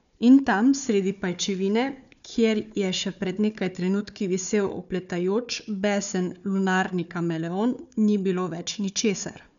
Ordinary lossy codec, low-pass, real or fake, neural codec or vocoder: none; 7.2 kHz; fake; codec, 16 kHz, 4 kbps, FunCodec, trained on Chinese and English, 50 frames a second